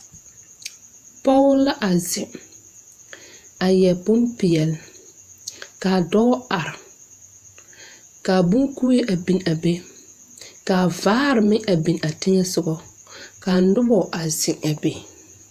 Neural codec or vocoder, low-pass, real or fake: vocoder, 48 kHz, 128 mel bands, Vocos; 14.4 kHz; fake